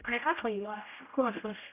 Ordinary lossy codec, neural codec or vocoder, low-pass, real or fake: none; codec, 16 kHz, 0.5 kbps, X-Codec, HuBERT features, trained on general audio; 3.6 kHz; fake